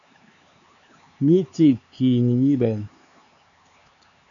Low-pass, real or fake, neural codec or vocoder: 7.2 kHz; fake; codec, 16 kHz, 4 kbps, X-Codec, HuBERT features, trained on LibriSpeech